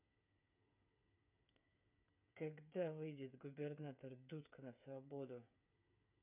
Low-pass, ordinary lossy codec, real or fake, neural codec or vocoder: 3.6 kHz; none; fake; codec, 16 kHz, 8 kbps, FreqCodec, smaller model